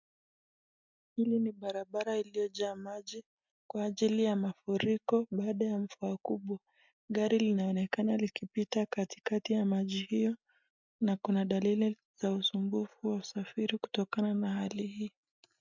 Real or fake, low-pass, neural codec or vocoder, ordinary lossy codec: real; 7.2 kHz; none; MP3, 64 kbps